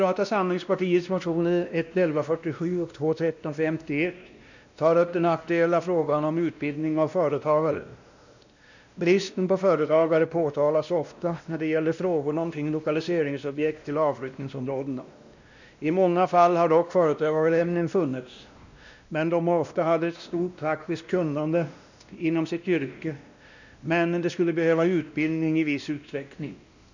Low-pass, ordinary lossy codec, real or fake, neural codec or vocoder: 7.2 kHz; none; fake; codec, 16 kHz, 1 kbps, X-Codec, WavLM features, trained on Multilingual LibriSpeech